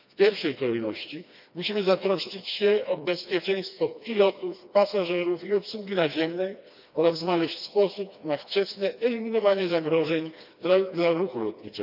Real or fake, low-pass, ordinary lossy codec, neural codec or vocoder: fake; 5.4 kHz; none; codec, 16 kHz, 2 kbps, FreqCodec, smaller model